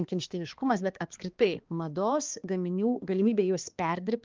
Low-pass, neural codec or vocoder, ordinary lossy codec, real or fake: 7.2 kHz; codec, 16 kHz, 2 kbps, X-Codec, HuBERT features, trained on balanced general audio; Opus, 32 kbps; fake